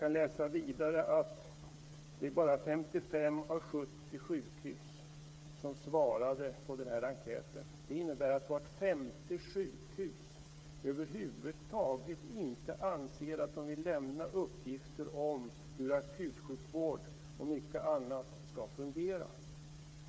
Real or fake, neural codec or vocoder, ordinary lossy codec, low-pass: fake; codec, 16 kHz, 8 kbps, FreqCodec, smaller model; none; none